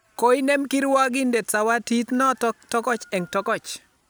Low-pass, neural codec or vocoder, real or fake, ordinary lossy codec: none; none; real; none